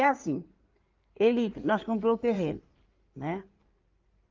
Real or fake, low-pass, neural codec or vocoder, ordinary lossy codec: fake; 7.2 kHz; codec, 16 kHz in and 24 kHz out, 2.2 kbps, FireRedTTS-2 codec; Opus, 32 kbps